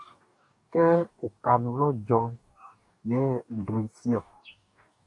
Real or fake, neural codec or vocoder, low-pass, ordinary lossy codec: fake; codec, 44.1 kHz, 2.6 kbps, DAC; 10.8 kHz; AAC, 48 kbps